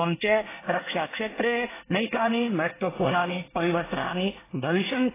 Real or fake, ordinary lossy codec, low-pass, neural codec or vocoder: fake; AAC, 16 kbps; 3.6 kHz; codec, 24 kHz, 1 kbps, SNAC